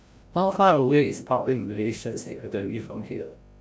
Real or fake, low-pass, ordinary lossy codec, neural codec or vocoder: fake; none; none; codec, 16 kHz, 0.5 kbps, FreqCodec, larger model